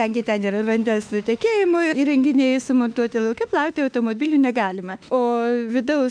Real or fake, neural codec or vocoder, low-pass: fake; autoencoder, 48 kHz, 32 numbers a frame, DAC-VAE, trained on Japanese speech; 9.9 kHz